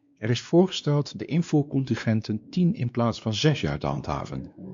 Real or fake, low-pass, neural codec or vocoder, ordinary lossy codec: fake; 7.2 kHz; codec, 16 kHz, 2 kbps, X-Codec, WavLM features, trained on Multilingual LibriSpeech; MP3, 64 kbps